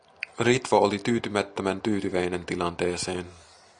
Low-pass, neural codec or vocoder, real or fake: 9.9 kHz; none; real